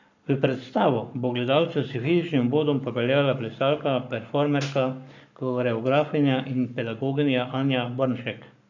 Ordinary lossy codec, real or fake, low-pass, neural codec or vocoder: none; fake; 7.2 kHz; codec, 44.1 kHz, 7.8 kbps, Pupu-Codec